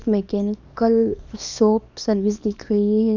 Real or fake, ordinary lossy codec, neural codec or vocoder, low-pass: fake; none; codec, 24 kHz, 0.9 kbps, WavTokenizer, small release; 7.2 kHz